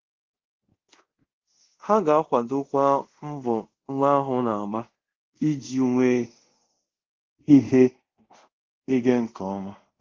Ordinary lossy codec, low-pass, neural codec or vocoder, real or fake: Opus, 16 kbps; 7.2 kHz; codec, 24 kHz, 0.5 kbps, DualCodec; fake